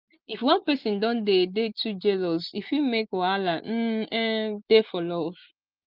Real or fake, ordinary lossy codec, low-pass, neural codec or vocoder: real; Opus, 32 kbps; 5.4 kHz; none